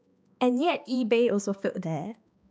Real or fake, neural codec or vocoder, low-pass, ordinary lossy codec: fake; codec, 16 kHz, 2 kbps, X-Codec, HuBERT features, trained on balanced general audio; none; none